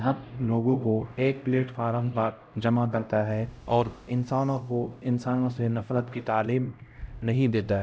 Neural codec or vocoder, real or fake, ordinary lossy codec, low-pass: codec, 16 kHz, 0.5 kbps, X-Codec, HuBERT features, trained on LibriSpeech; fake; none; none